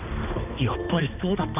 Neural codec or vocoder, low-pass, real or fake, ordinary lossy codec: codec, 16 kHz, 2 kbps, X-Codec, HuBERT features, trained on balanced general audio; 3.6 kHz; fake; none